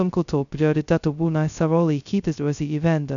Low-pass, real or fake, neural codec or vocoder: 7.2 kHz; fake; codec, 16 kHz, 0.2 kbps, FocalCodec